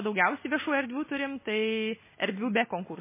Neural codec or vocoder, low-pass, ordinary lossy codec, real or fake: none; 3.6 kHz; MP3, 16 kbps; real